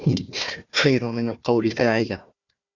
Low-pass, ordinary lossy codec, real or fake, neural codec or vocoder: 7.2 kHz; Opus, 64 kbps; fake; codec, 16 kHz, 1 kbps, FunCodec, trained on Chinese and English, 50 frames a second